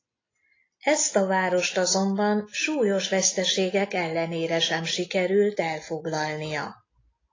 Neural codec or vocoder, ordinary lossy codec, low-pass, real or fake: none; AAC, 32 kbps; 7.2 kHz; real